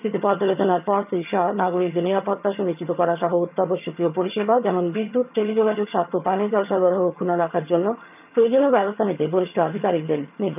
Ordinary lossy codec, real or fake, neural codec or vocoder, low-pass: none; fake; vocoder, 22.05 kHz, 80 mel bands, HiFi-GAN; 3.6 kHz